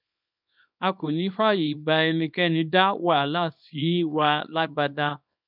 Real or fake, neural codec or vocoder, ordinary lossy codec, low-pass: fake; codec, 24 kHz, 0.9 kbps, WavTokenizer, small release; none; 5.4 kHz